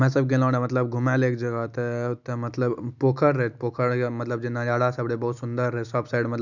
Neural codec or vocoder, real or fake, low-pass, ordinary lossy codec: none; real; 7.2 kHz; none